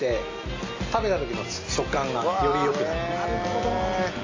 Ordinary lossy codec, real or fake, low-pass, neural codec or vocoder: AAC, 32 kbps; real; 7.2 kHz; none